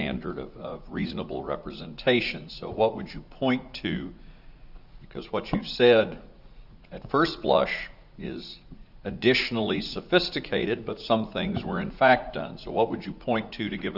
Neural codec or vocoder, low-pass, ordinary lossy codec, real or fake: vocoder, 44.1 kHz, 80 mel bands, Vocos; 5.4 kHz; Opus, 64 kbps; fake